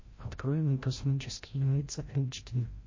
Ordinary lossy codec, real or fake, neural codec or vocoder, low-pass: MP3, 32 kbps; fake; codec, 16 kHz, 0.5 kbps, FreqCodec, larger model; 7.2 kHz